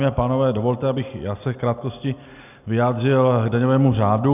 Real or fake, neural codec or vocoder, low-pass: real; none; 3.6 kHz